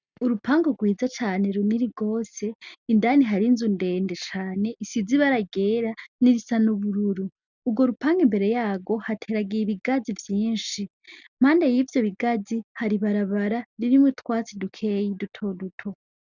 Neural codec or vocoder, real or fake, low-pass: none; real; 7.2 kHz